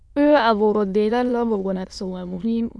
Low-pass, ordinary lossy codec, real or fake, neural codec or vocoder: none; none; fake; autoencoder, 22.05 kHz, a latent of 192 numbers a frame, VITS, trained on many speakers